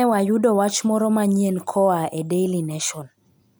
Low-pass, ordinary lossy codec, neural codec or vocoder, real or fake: none; none; none; real